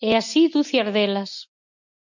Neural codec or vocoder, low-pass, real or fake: none; 7.2 kHz; real